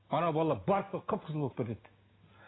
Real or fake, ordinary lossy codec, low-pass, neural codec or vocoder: real; AAC, 16 kbps; 7.2 kHz; none